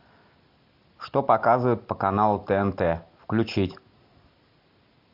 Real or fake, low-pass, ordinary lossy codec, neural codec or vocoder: real; 5.4 kHz; MP3, 48 kbps; none